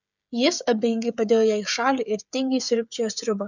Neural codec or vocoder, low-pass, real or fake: codec, 16 kHz, 16 kbps, FreqCodec, smaller model; 7.2 kHz; fake